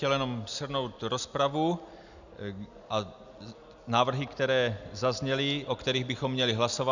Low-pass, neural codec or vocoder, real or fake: 7.2 kHz; none; real